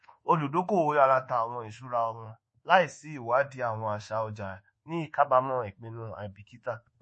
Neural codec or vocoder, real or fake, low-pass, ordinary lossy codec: codec, 24 kHz, 1.2 kbps, DualCodec; fake; 10.8 kHz; MP3, 32 kbps